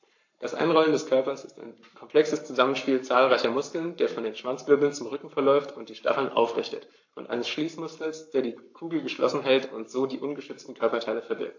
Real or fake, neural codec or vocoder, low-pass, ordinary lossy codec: fake; codec, 44.1 kHz, 7.8 kbps, Pupu-Codec; 7.2 kHz; AAC, 48 kbps